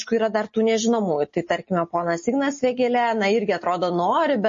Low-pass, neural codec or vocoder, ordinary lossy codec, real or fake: 7.2 kHz; none; MP3, 32 kbps; real